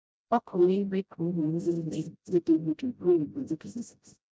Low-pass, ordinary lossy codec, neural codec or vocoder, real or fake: none; none; codec, 16 kHz, 0.5 kbps, FreqCodec, smaller model; fake